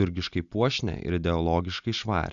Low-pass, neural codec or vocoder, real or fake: 7.2 kHz; none; real